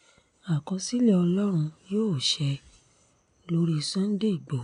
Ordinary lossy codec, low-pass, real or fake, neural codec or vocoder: none; 9.9 kHz; real; none